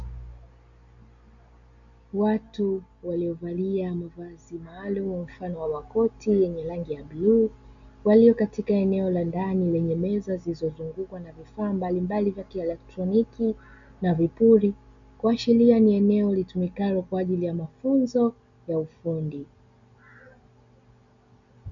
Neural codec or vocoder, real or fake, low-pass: none; real; 7.2 kHz